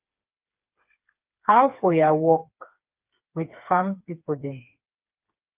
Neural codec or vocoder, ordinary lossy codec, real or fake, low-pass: codec, 16 kHz, 4 kbps, FreqCodec, smaller model; Opus, 24 kbps; fake; 3.6 kHz